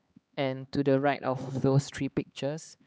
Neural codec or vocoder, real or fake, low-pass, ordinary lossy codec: codec, 16 kHz, 4 kbps, X-Codec, HuBERT features, trained on LibriSpeech; fake; none; none